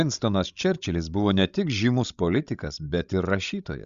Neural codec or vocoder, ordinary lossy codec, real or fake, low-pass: codec, 16 kHz, 16 kbps, FreqCodec, larger model; Opus, 64 kbps; fake; 7.2 kHz